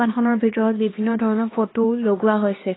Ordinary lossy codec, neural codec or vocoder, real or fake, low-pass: AAC, 16 kbps; codec, 16 kHz, 2 kbps, X-Codec, HuBERT features, trained on LibriSpeech; fake; 7.2 kHz